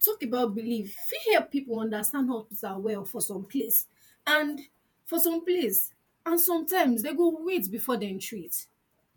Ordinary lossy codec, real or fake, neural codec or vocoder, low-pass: none; fake; vocoder, 48 kHz, 128 mel bands, Vocos; none